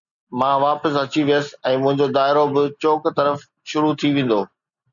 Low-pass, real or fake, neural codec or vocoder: 7.2 kHz; real; none